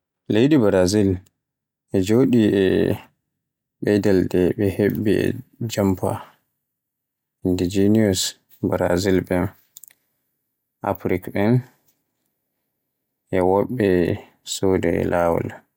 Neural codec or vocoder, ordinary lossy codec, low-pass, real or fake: none; none; 19.8 kHz; real